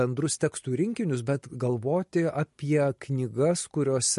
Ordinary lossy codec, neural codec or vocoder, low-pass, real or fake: MP3, 48 kbps; none; 14.4 kHz; real